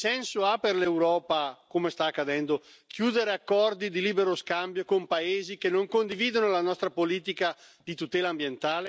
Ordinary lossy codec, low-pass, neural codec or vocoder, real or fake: none; none; none; real